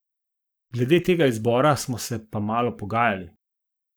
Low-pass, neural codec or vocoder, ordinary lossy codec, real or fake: none; codec, 44.1 kHz, 7.8 kbps, Pupu-Codec; none; fake